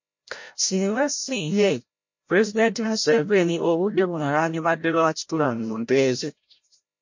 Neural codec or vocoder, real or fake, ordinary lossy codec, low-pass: codec, 16 kHz, 0.5 kbps, FreqCodec, larger model; fake; MP3, 48 kbps; 7.2 kHz